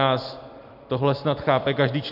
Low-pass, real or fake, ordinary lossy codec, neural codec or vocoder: 5.4 kHz; real; MP3, 48 kbps; none